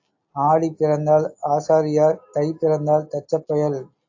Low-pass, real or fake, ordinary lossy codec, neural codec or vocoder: 7.2 kHz; real; AAC, 48 kbps; none